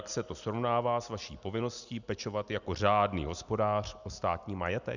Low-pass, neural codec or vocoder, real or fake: 7.2 kHz; none; real